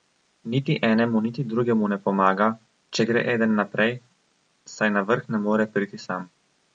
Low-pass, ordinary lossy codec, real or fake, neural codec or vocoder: 9.9 kHz; MP3, 48 kbps; real; none